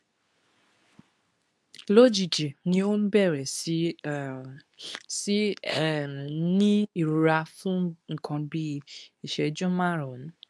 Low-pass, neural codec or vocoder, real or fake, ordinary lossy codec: none; codec, 24 kHz, 0.9 kbps, WavTokenizer, medium speech release version 2; fake; none